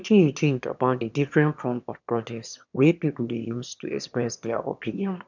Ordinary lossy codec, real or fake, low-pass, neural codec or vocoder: none; fake; 7.2 kHz; autoencoder, 22.05 kHz, a latent of 192 numbers a frame, VITS, trained on one speaker